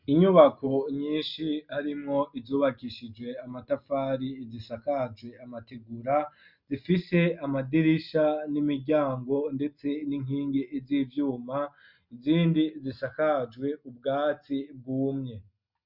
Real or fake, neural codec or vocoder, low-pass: real; none; 5.4 kHz